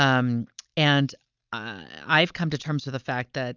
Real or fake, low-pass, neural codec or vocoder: real; 7.2 kHz; none